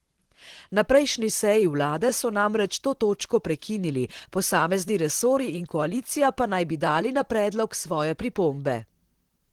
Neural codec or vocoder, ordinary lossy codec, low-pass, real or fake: vocoder, 44.1 kHz, 128 mel bands, Pupu-Vocoder; Opus, 16 kbps; 19.8 kHz; fake